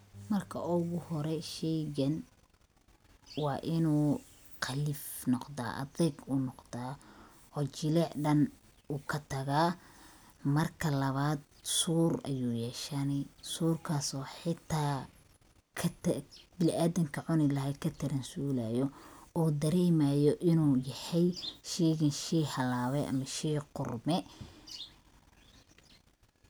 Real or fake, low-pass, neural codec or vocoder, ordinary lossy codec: real; none; none; none